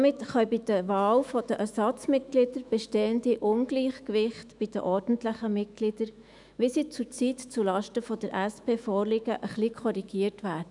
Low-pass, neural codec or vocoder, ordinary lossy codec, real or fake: 10.8 kHz; autoencoder, 48 kHz, 128 numbers a frame, DAC-VAE, trained on Japanese speech; none; fake